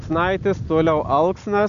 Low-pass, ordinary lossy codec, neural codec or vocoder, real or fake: 7.2 kHz; MP3, 96 kbps; none; real